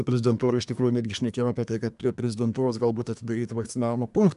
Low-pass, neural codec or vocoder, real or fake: 10.8 kHz; codec, 24 kHz, 1 kbps, SNAC; fake